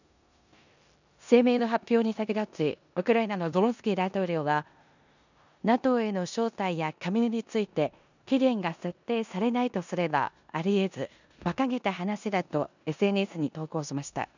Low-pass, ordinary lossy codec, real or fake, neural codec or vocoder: 7.2 kHz; none; fake; codec, 16 kHz in and 24 kHz out, 0.9 kbps, LongCat-Audio-Codec, four codebook decoder